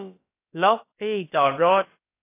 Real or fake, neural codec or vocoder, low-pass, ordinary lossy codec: fake; codec, 16 kHz, about 1 kbps, DyCAST, with the encoder's durations; 3.6 kHz; AAC, 24 kbps